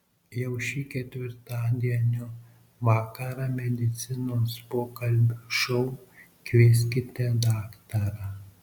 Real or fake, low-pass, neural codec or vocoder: real; 19.8 kHz; none